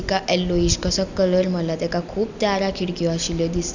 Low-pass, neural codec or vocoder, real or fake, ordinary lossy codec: 7.2 kHz; none; real; none